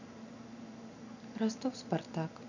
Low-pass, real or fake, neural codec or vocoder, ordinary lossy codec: 7.2 kHz; real; none; none